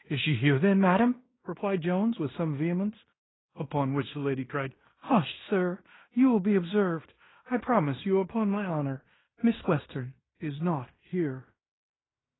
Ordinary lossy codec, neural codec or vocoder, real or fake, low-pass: AAC, 16 kbps; codec, 16 kHz in and 24 kHz out, 0.9 kbps, LongCat-Audio-Codec, fine tuned four codebook decoder; fake; 7.2 kHz